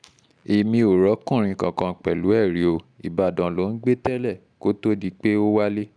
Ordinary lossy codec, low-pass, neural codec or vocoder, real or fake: none; 9.9 kHz; none; real